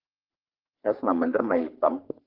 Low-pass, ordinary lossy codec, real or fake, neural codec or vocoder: 5.4 kHz; Opus, 16 kbps; fake; codec, 44.1 kHz, 3.4 kbps, Pupu-Codec